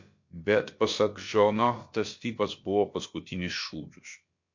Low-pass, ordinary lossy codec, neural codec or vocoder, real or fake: 7.2 kHz; MP3, 48 kbps; codec, 16 kHz, about 1 kbps, DyCAST, with the encoder's durations; fake